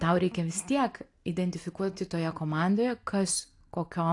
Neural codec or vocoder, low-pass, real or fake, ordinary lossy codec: none; 10.8 kHz; real; AAC, 48 kbps